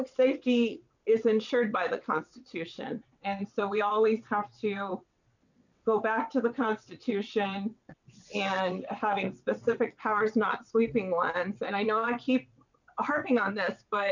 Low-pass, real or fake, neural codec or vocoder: 7.2 kHz; fake; vocoder, 22.05 kHz, 80 mel bands, WaveNeXt